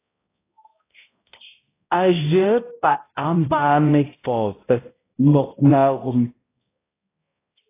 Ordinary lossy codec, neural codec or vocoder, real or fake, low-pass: AAC, 16 kbps; codec, 16 kHz, 0.5 kbps, X-Codec, HuBERT features, trained on balanced general audio; fake; 3.6 kHz